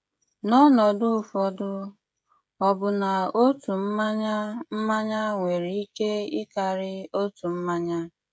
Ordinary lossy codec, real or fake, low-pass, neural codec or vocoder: none; fake; none; codec, 16 kHz, 16 kbps, FreqCodec, smaller model